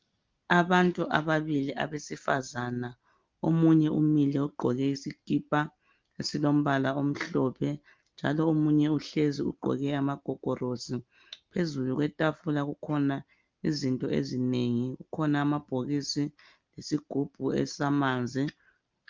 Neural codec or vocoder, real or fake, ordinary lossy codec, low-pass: none; real; Opus, 24 kbps; 7.2 kHz